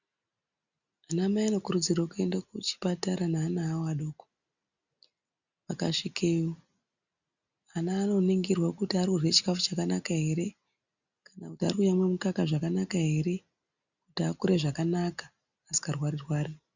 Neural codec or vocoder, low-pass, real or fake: none; 7.2 kHz; real